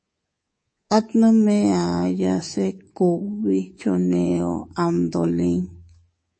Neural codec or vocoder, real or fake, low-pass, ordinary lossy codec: codec, 24 kHz, 3.1 kbps, DualCodec; fake; 9.9 kHz; MP3, 32 kbps